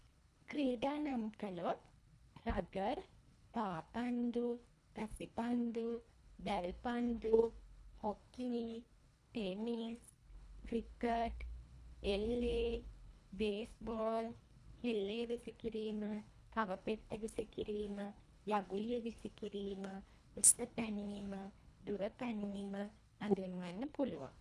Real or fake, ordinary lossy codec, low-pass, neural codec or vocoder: fake; none; none; codec, 24 kHz, 1.5 kbps, HILCodec